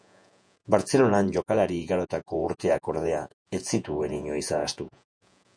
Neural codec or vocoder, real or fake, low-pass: vocoder, 48 kHz, 128 mel bands, Vocos; fake; 9.9 kHz